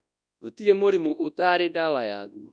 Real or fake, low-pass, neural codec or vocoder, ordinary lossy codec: fake; 9.9 kHz; codec, 24 kHz, 0.9 kbps, WavTokenizer, large speech release; none